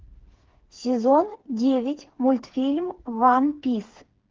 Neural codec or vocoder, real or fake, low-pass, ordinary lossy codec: codec, 16 kHz, 4 kbps, FreqCodec, smaller model; fake; 7.2 kHz; Opus, 16 kbps